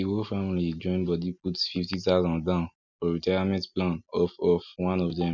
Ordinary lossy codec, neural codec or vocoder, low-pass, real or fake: none; none; 7.2 kHz; real